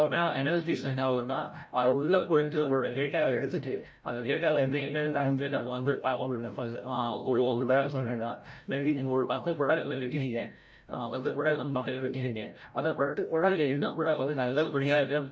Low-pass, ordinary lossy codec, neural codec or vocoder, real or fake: none; none; codec, 16 kHz, 0.5 kbps, FreqCodec, larger model; fake